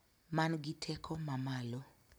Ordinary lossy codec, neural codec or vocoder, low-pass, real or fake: none; none; none; real